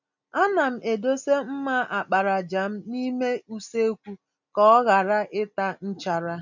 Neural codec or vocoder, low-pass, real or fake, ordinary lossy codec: none; 7.2 kHz; real; none